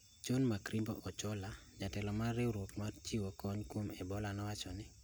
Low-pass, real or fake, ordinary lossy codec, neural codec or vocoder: none; real; none; none